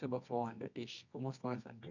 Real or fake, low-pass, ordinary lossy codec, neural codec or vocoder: fake; 7.2 kHz; none; codec, 24 kHz, 0.9 kbps, WavTokenizer, medium music audio release